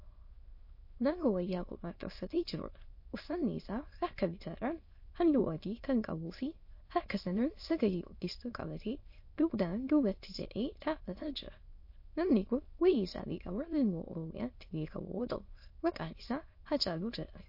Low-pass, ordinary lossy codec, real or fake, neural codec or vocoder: 5.4 kHz; MP3, 32 kbps; fake; autoencoder, 22.05 kHz, a latent of 192 numbers a frame, VITS, trained on many speakers